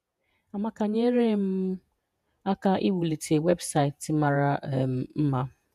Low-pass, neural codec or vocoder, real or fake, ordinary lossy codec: 14.4 kHz; vocoder, 48 kHz, 128 mel bands, Vocos; fake; none